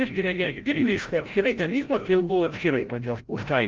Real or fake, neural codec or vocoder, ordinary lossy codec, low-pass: fake; codec, 16 kHz, 0.5 kbps, FreqCodec, larger model; Opus, 32 kbps; 7.2 kHz